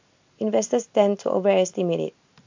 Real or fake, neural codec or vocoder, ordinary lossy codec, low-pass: real; none; AAC, 48 kbps; 7.2 kHz